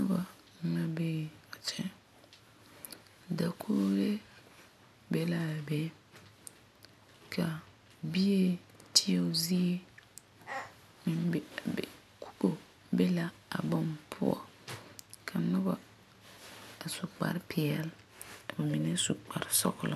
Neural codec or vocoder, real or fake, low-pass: none; real; 14.4 kHz